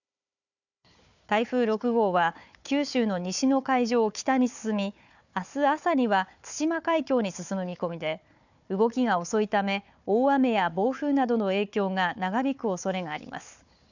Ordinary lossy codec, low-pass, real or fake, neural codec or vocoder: none; 7.2 kHz; fake; codec, 16 kHz, 4 kbps, FunCodec, trained on Chinese and English, 50 frames a second